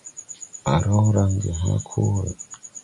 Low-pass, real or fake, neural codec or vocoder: 10.8 kHz; real; none